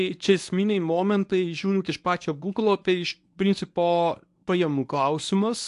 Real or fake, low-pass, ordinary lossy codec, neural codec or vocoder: fake; 10.8 kHz; AAC, 64 kbps; codec, 24 kHz, 0.9 kbps, WavTokenizer, medium speech release version 1